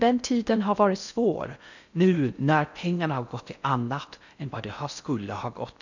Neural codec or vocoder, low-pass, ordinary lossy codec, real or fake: codec, 16 kHz in and 24 kHz out, 0.6 kbps, FocalCodec, streaming, 4096 codes; 7.2 kHz; none; fake